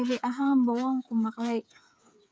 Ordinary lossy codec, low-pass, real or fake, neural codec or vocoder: none; none; fake; codec, 16 kHz, 8 kbps, FreqCodec, smaller model